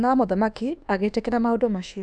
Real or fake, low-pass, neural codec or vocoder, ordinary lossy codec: fake; none; codec, 24 kHz, 1.2 kbps, DualCodec; none